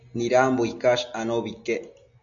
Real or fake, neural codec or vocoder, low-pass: real; none; 7.2 kHz